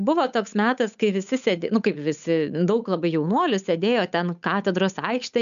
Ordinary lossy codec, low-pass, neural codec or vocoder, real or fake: MP3, 96 kbps; 7.2 kHz; codec, 16 kHz, 8 kbps, FunCodec, trained on Chinese and English, 25 frames a second; fake